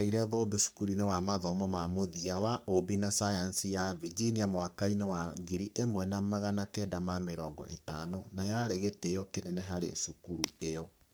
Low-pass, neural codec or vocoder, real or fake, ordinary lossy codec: none; codec, 44.1 kHz, 3.4 kbps, Pupu-Codec; fake; none